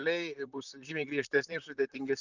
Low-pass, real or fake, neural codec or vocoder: 7.2 kHz; fake; vocoder, 44.1 kHz, 128 mel bands, Pupu-Vocoder